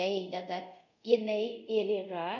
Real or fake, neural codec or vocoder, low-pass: fake; codec, 24 kHz, 0.5 kbps, DualCodec; 7.2 kHz